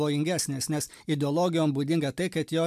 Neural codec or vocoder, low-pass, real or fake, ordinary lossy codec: none; 14.4 kHz; real; MP3, 96 kbps